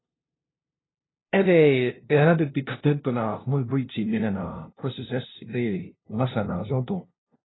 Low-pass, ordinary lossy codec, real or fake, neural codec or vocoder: 7.2 kHz; AAC, 16 kbps; fake; codec, 16 kHz, 0.5 kbps, FunCodec, trained on LibriTTS, 25 frames a second